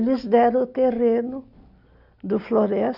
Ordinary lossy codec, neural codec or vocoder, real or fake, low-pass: none; none; real; 5.4 kHz